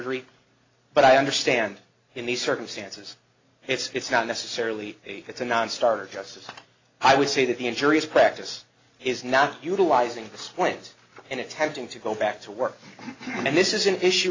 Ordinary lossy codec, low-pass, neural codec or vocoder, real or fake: AAC, 32 kbps; 7.2 kHz; none; real